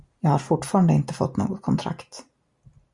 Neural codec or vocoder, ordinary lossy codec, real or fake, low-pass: none; Opus, 64 kbps; real; 10.8 kHz